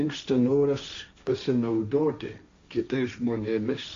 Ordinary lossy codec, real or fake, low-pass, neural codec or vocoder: AAC, 48 kbps; fake; 7.2 kHz; codec, 16 kHz, 1.1 kbps, Voila-Tokenizer